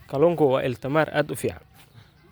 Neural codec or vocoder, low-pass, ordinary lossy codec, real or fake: none; none; none; real